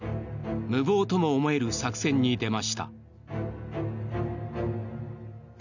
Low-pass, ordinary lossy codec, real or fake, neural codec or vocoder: 7.2 kHz; none; real; none